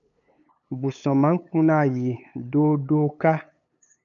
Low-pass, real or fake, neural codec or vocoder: 7.2 kHz; fake; codec, 16 kHz, 8 kbps, FunCodec, trained on LibriTTS, 25 frames a second